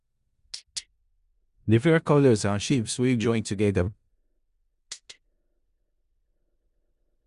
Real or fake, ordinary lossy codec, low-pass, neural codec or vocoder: fake; Opus, 64 kbps; 10.8 kHz; codec, 16 kHz in and 24 kHz out, 0.4 kbps, LongCat-Audio-Codec, four codebook decoder